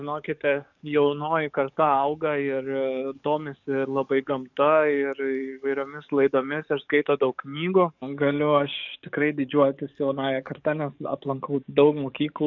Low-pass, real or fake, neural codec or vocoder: 7.2 kHz; fake; codec, 44.1 kHz, 7.8 kbps, DAC